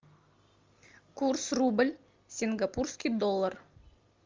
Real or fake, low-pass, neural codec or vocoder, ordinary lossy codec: real; 7.2 kHz; none; Opus, 32 kbps